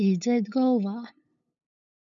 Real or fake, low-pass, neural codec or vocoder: fake; 7.2 kHz; codec, 16 kHz, 8 kbps, FunCodec, trained on LibriTTS, 25 frames a second